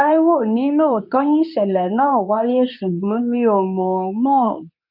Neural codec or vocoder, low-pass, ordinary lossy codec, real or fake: codec, 24 kHz, 0.9 kbps, WavTokenizer, medium speech release version 2; 5.4 kHz; none; fake